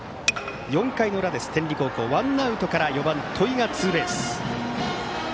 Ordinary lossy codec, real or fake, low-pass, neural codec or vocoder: none; real; none; none